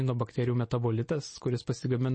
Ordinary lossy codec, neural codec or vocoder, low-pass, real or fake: MP3, 32 kbps; none; 9.9 kHz; real